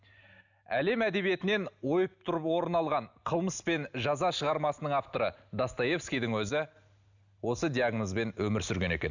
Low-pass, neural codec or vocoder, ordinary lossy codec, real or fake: 7.2 kHz; none; none; real